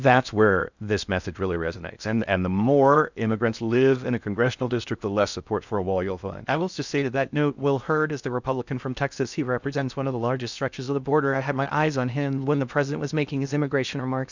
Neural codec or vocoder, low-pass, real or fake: codec, 16 kHz in and 24 kHz out, 0.6 kbps, FocalCodec, streaming, 2048 codes; 7.2 kHz; fake